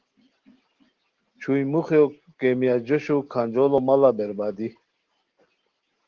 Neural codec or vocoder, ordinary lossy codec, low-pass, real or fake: none; Opus, 16 kbps; 7.2 kHz; real